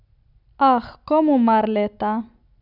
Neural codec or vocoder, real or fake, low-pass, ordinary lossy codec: none; real; 5.4 kHz; none